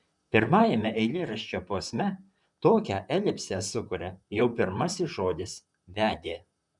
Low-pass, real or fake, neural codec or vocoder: 10.8 kHz; fake; vocoder, 44.1 kHz, 128 mel bands, Pupu-Vocoder